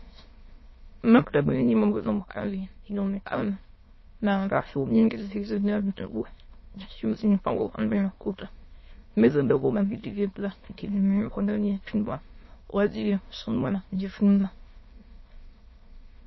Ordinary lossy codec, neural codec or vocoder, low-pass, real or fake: MP3, 24 kbps; autoencoder, 22.05 kHz, a latent of 192 numbers a frame, VITS, trained on many speakers; 7.2 kHz; fake